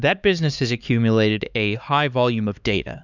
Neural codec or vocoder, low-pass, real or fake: codec, 16 kHz, 4 kbps, X-Codec, HuBERT features, trained on LibriSpeech; 7.2 kHz; fake